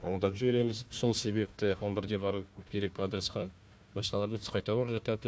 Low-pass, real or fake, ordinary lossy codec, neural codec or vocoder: none; fake; none; codec, 16 kHz, 1 kbps, FunCodec, trained on Chinese and English, 50 frames a second